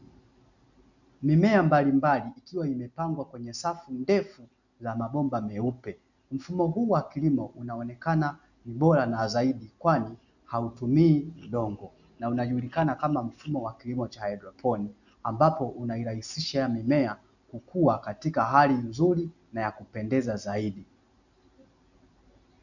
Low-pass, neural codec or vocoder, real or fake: 7.2 kHz; none; real